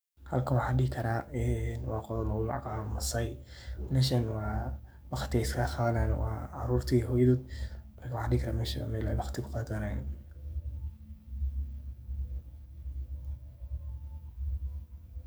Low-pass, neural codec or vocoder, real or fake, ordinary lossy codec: none; codec, 44.1 kHz, 7.8 kbps, DAC; fake; none